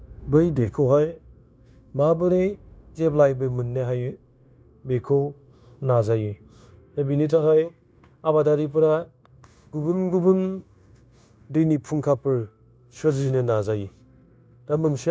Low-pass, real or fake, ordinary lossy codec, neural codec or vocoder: none; fake; none; codec, 16 kHz, 0.9 kbps, LongCat-Audio-Codec